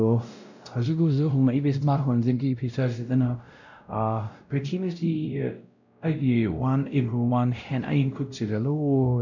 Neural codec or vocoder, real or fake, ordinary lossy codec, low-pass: codec, 16 kHz, 0.5 kbps, X-Codec, WavLM features, trained on Multilingual LibriSpeech; fake; none; 7.2 kHz